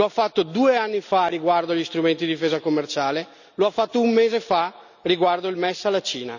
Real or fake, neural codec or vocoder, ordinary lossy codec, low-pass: real; none; none; 7.2 kHz